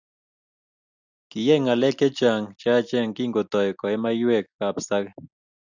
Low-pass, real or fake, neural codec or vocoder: 7.2 kHz; real; none